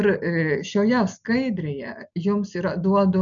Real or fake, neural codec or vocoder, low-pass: real; none; 7.2 kHz